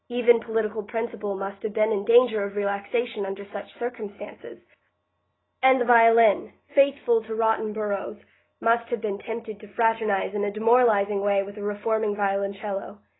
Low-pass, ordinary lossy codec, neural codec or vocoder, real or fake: 7.2 kHz; AAC, 16 kbps; none; real